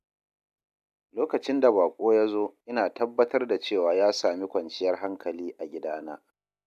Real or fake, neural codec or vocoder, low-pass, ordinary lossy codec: real; none; 14.4 kHz; AAC, 96 kbps